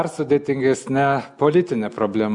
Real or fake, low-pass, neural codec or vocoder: real; 10.8 kHz; none